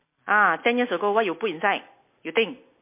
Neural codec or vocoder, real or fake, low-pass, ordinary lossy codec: none; real; 3.6 kHz; MP3, 24 kbps